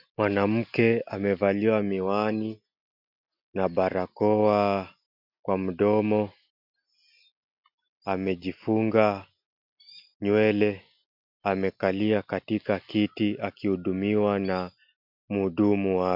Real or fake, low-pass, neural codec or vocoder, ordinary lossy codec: real; 5.4 kHz; none; MP3, 48 kbps